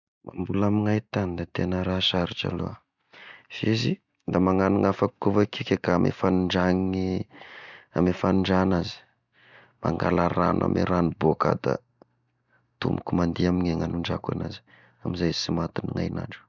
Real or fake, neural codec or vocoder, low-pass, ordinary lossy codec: real; none; 7.2 kHz; none